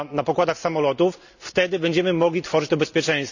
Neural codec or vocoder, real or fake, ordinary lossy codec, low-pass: none; real; none; 7.2 kHz